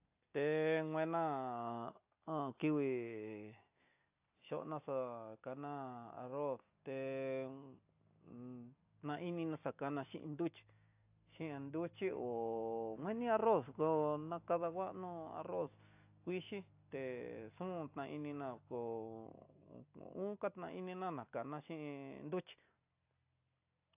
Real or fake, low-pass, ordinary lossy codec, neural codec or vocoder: real; 3.6 kHz; AAC, 32 kbps; none